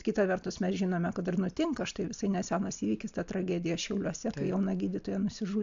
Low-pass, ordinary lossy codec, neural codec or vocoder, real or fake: 7.2 kHz; AAC, 64 kbps; none; real